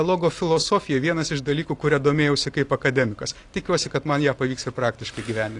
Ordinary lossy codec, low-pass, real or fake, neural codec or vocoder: AAC, 48 kbps; 10.8 kHz; real; none